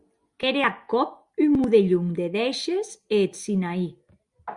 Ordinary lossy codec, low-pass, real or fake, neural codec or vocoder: Opus, 64 kbps; 10.8 kHz; real; none